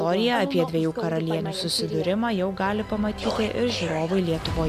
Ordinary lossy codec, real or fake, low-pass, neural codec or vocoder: MP3, 96 kbps; real; 14.4 kHz; none